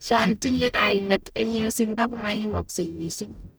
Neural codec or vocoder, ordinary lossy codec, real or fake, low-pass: codec, 44.1 kHz, 0.9 kbps, DAC; none; fake; none